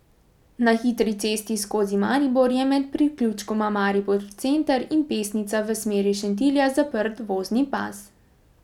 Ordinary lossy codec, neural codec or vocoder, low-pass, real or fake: none; none; 19.8 kHz; real